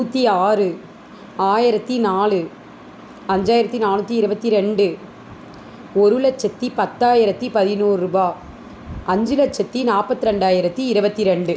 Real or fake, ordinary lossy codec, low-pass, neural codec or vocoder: real; none; none; none